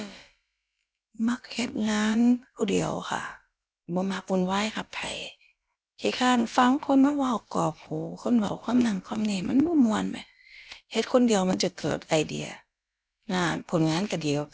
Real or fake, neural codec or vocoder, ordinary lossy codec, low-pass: fake; codec, 16 kHz, about 1 kbps, DyCAST, with the encoder's durations; none; none